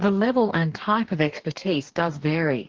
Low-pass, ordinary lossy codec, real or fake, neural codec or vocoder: 7.2 kHz; Opus, 16 kbps; fake; codec, 44.1 kHz, 2.6 kbps, DAC